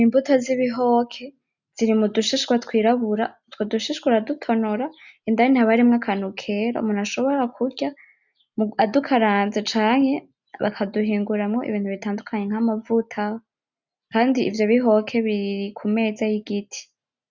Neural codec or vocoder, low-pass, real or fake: none; 7.2 kHz; real